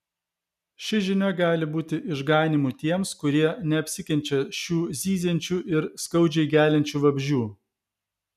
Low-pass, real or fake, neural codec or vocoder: 14.4 kHz; real; none